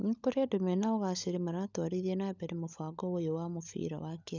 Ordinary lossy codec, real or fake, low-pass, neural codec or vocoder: none; fake; 7.2 kHz; codec, 16 kHz, 16 kbps, FunCodec, trained on LibriTTS, 50 frames a second